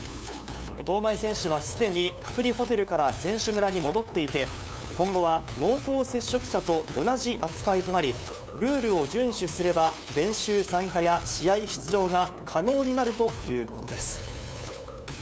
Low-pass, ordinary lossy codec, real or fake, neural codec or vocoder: none; none; fake; codec, 16 kHz, 2 kbps, FunCodec, trained on LibriTTS, 25 frames a second